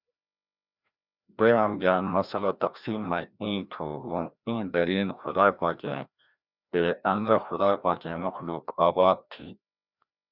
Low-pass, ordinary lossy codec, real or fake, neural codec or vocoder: 5.4 kHz; Opus, 64 kbps; fake; codec, 16 kHz, 1 kbps, FreqCodec, larger model